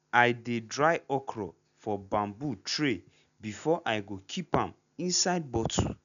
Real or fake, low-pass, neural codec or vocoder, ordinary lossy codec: real; 7.2 kHz; none; none